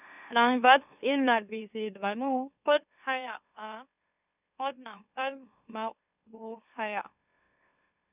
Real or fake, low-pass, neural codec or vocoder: fake; 3.6 kHz; autoencoder, 44.1 kHz, a latent of 192 numbers a frame, MeloTTS